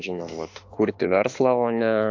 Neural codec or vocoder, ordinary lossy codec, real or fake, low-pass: autoencoder, 48 kHz, 32 numbers a frame, DAC-VAE, trained on Japanese speech; AAC, 48 kbps; fake; 7.2 kHz